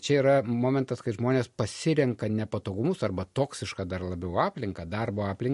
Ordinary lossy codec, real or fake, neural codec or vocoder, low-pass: MP3, 48 kbps; real; none; 14.4 kHz